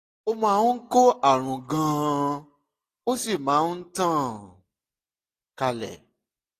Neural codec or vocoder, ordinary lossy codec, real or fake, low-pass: none; AAC, 48 kbps; real; 14.4 kHz